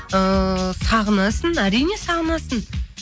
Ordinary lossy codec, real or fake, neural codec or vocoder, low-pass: none; real; none; none